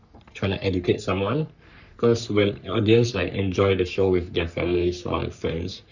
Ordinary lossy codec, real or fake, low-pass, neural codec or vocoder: none; fake; 7.2 kHz; codec, 44.1 kHz, 3.4 kbps, Pupu-Codec